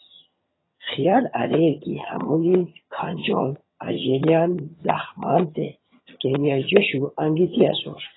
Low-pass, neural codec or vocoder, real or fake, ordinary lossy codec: 7.2 kHz; vocoder, 22.05 kHz, 80 mel bands, HiFi-GAN; fake; AAC, 16 kbps